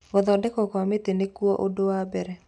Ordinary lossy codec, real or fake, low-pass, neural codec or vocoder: none; real; 10.8 kHz; none